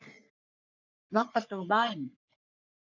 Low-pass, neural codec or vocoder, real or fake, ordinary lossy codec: 7.2 kHz; codec, 44.1 kHz, 3.4 kbps, Pupu-Codec; fake; none